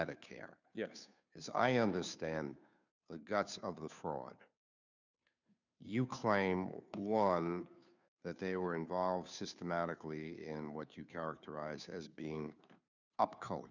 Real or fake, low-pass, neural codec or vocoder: fake; 7.2 kHz; codec, 16 kHz, 2 kbps, FunCodec, trained on Chinese and English, 25 frames a second